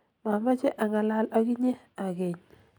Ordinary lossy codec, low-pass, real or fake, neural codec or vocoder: none; 19.8 kHz; real; none